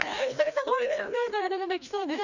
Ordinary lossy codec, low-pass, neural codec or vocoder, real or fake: none; 7.2 kHz; codec, 16 kHz, 1 kbps, FreqCodec, larger model; fake